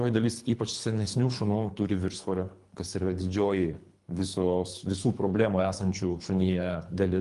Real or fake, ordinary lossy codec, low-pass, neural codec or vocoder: fake; Opus, 32 kbps; 10.8 kHz; codec, 24 kHz, 3 kbps, HILCodec